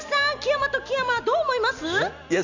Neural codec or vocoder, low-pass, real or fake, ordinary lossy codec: none; 7.2 kHz; real; none